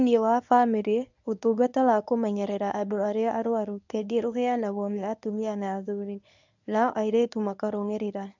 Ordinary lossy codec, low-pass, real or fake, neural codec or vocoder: none; 7.2 kHz; fake; codec, 24 kHz, 0.9 kbps, WavTokenizer, medium speech release version 1